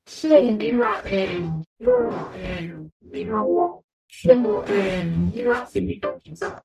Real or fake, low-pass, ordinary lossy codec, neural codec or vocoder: fake; 14.4 kHz; none; codec, 44.1 kHz, 0.9 kbps, DAC